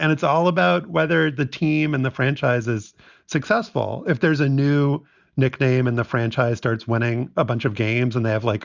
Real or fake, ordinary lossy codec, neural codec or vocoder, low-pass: real; Opus, 64 kbps; none; 7.2 kHz